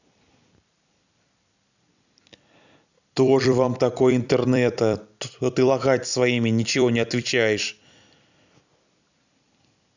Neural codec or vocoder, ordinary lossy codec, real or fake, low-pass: vocoder, 44.1 kHz, 128 mel bands every 256 samples, BigVGAN v2; none; fake; 7.2 kHz